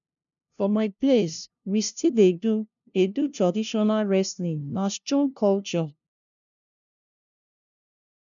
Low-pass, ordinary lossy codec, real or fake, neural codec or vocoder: 7.2 kHz; none; fake; codec, 16 kHz, 0.5 kbps, FunCodec, trained on LibriTTS, 25 frames a second